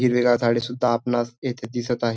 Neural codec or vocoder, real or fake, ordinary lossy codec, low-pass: none; real; none; none